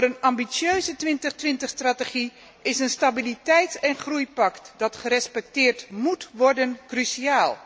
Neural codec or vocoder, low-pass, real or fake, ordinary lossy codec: none; none; real; none